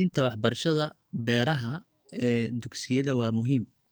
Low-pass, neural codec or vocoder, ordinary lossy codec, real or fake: none; codec, 44.1 kHz, 2.6 kbps, SNAC; none; fake